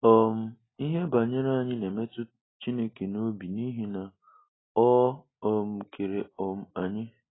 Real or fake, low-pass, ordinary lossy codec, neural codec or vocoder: real; 7.2 kHz; AAC, 16 kbps; none